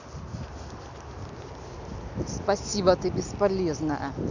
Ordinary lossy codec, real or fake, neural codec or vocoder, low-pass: none; real; none; 7.2 kHz